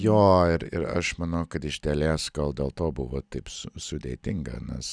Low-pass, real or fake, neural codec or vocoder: 9.9 kHz; real; none